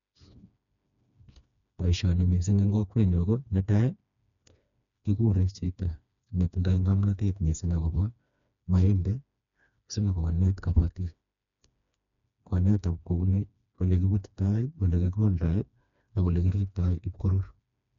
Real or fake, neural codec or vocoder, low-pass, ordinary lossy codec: fake; codec, 16 kHz, 2 kbps, FreqCodec, smaller model; 7.2 kHz; none